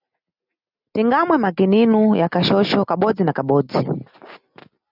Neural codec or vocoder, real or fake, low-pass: none; real; 5.4 kHz